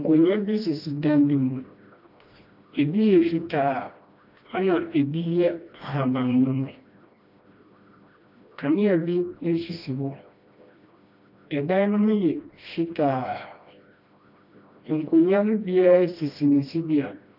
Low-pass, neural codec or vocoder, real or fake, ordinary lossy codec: 5.4 kHz; codec, 16 kHz, 1 kbps, FreqCodec, smaller model; fake; AAC, 48 kbps